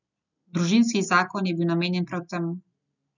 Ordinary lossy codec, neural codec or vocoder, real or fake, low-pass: none; none; real; 7.2 kHz